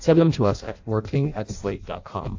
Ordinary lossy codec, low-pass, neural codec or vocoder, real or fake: AAC, 48 kbps; 7.2 kHz; codec, 16 kHz in and 24 kHz out, 0.6 kbps, FireRedTTS-2 codec; fake